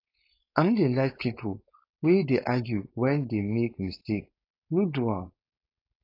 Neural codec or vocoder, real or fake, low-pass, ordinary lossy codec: codec, 16 kHz, 4.8 kbps, FACodec; fake; 5.4 kHz; AAC, 32 kbps